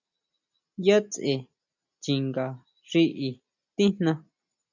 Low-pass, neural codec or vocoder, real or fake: 7.2 kHz; none; real